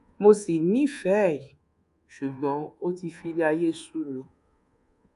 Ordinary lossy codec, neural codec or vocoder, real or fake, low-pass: none; codec, 24 kHz, 1.2 kbps, DualCodec; fake; 10.8 kHz